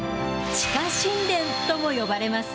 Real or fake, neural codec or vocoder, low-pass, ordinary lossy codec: real; none; none; none